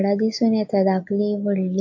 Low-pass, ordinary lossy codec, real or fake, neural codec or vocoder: 7.2 kHz; AAC, 48 kbps; real; none